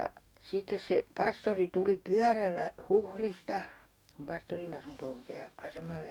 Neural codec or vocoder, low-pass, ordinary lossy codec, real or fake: codec, 44.1 kHz, 2.6 kbps, DAC; 19.8 kHz; none; fake